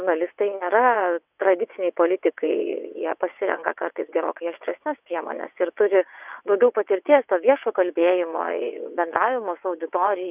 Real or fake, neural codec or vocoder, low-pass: fake; vocoder, 22.05 kHz, 80 mel bands, WaveNeXt; 3.6 kHz